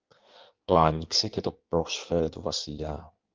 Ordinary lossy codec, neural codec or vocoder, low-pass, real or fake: Opus, 32 kbps; codec, 16 kHz in and 24 kHz out, 1.1 kbps, FireRedTTS-2 codec; 7.2 kHz; fake